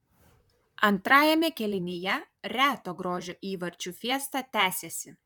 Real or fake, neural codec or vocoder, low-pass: fake; vocoder, 44.1 kHz, 128 mel bands, Pupu-Vocoder; 19.8 kHz